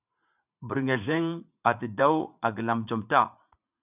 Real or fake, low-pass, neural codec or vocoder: fake; 3.6 kHz; vocoder, 44.1 kHz, 80 mel bands, Vocos